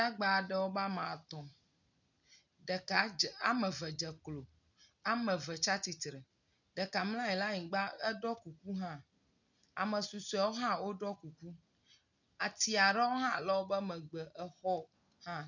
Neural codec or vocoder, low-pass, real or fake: none; 7.2 kHz; real